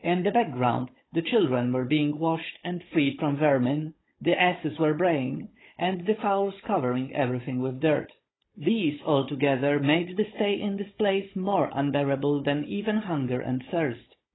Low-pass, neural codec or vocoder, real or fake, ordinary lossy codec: 7.2 kHz; codec, 16 kHz, 16 kbps, FunCodec, trained on Chinese and English, 50 frames a second; fake; AAC, 16 kbps